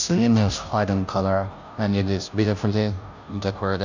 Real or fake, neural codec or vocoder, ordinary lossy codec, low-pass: fake; codec, 16 kHz, 0.5 kbps, FunCodec, trained on Chinese and English, 25 frames a second; none; 7.2 kHz